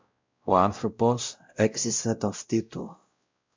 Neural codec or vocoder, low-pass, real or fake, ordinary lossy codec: codec, 16 kHz, 0.5 kbps, X-Codec, WavLM features, trained on Multilingual LibriSpeech; 7.2 kHz; fake; MP3, 64 kbps